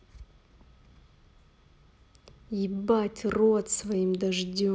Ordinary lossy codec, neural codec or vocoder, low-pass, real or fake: none; none; none; real